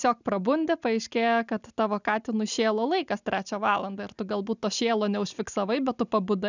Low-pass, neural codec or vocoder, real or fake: 7.2 kHz; none; real